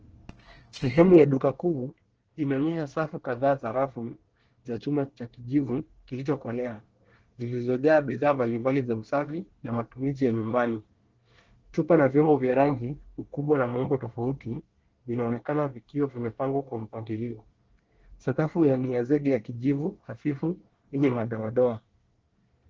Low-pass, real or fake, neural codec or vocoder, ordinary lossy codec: 7.2 kHz; fake; codec, 24 kHz, 1 kbps, SNAC; Opus, 16 kbps